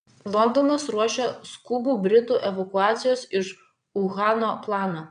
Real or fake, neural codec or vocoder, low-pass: fake; vocoder, 22.05 kHz, 80 mel bands, WaveNeXt; 9.9 kHz